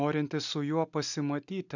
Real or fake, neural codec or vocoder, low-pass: real; none; 7.2 kHz